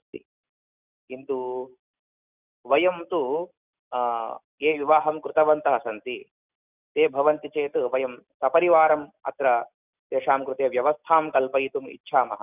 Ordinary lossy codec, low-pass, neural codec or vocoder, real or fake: none; 3.6 kHz; none; real